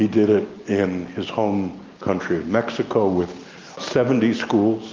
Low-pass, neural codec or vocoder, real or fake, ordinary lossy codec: 7.2 kHz; none; real; Opus, 24 kbps